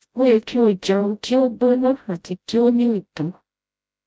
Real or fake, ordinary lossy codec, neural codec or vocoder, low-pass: fake; none; codec, 16 kHz, 0.5 kbps, FreqCodec, smaller model; none